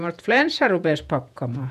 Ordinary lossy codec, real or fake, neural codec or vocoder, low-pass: none; fake; vocoder, 48 kHz, 128 mel bands, Vocos; 14.4 kHz